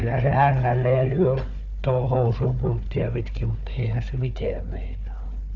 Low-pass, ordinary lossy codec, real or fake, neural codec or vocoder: 7.2 kHz; none; fake; codec, 16 kHz, 4 kbps, FunCodec, trained on Chinese and English, 50 frames a second